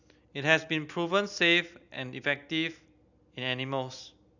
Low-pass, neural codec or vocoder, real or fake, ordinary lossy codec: 7.2 kHz; none; real; none